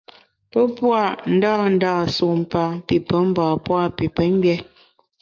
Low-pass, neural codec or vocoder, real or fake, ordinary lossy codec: 7.2 kHz; codec, 44.1 kHz, 7.8 kbps, DAC; fake; MP3, 64 kbps